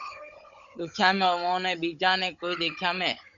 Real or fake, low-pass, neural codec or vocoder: fake; 7.2 kHz; codec, 16 kHz, 16 kbps, FunCodec, trained on Chinese and English, 50 frames a second